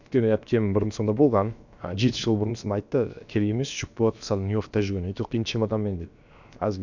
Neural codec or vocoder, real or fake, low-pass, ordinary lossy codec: codec, 16 kHz, about 1 kbps, DyCAST, with the encoder's durations; fake; 7.2 kHz; none